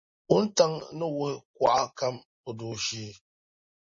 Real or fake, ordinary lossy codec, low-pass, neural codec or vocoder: real; MP3, 32 kbps; 7.2 kHz; none